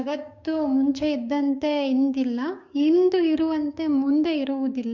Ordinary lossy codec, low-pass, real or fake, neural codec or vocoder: Opus, 64 kbps; 7.2 kHz; fake; codec, 16 kHz, 6 kbps, DAC